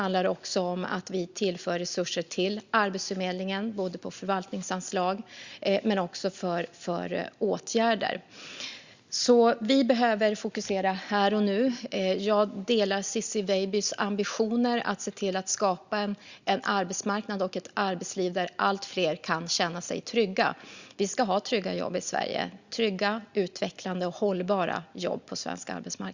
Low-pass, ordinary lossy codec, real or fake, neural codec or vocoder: 7.2 kHz; Opus, 64 kbps; real; none